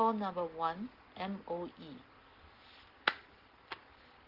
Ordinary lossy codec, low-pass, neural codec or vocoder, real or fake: Opus, 16 kbps; 5.4 kHz; none; real